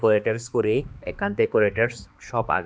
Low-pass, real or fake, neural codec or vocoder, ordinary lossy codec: none; fake; codec, 16 kHz, 2 kbps, X-Codec, HuBERT features, trained on balanced general audio; none